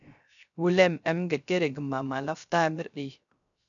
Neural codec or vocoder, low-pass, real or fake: codec, 16 kHz, 0.3 kbps, FocalCodec; 7.2 kHz; fake